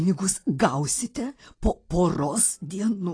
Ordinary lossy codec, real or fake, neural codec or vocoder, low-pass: AAC, 32 kbps; real; none; 9.9 kHz